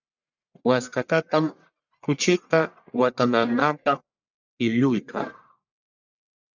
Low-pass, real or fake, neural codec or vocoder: 7.2 kHz; fake; codec, 44.1 kHz, 1.7 kbps, Pupu-Codec